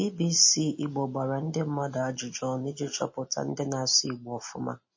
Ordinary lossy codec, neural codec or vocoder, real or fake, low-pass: MP3, 32 kbps; none; real; 7.2 kHz